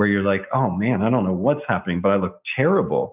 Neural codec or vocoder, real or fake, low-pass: none; real; 3.6 kHz